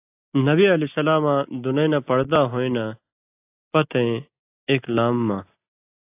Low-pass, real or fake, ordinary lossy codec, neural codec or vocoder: 3.6 kHz; real; AAC, 24 kbps; none